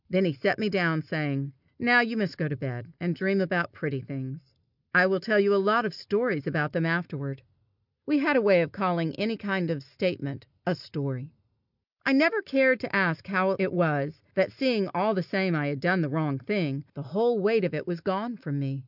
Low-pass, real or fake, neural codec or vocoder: 5.4 kHz; real; none